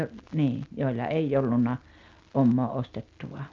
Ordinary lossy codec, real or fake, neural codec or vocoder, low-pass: Opus, 24 kbps; real; none; 7.2 kHz